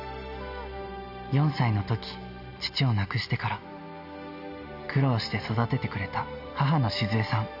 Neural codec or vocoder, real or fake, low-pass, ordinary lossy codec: none; real; 5.4 kHz; none